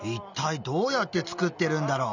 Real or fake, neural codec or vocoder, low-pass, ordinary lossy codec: real; none; 7.2 kHz; none